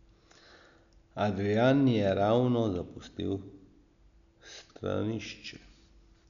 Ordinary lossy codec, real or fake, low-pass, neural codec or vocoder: none; real; 7.2 kHz; none